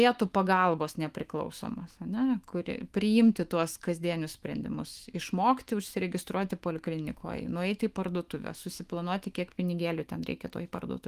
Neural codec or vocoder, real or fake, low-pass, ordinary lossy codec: autoencoder, 48 kHz, 128 numbers a frame, DAC-VAE, trained on Japanese speech; fake; 14.4 kHz; Opus, 24 kbps